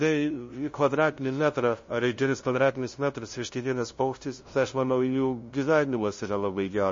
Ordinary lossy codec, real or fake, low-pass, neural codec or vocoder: MP3, 32 kbps; fake; 7.2 kHz; codec, 16 kHz, 0.5 kbps, FunCodec, trained on LibriTTS, 25 frames a second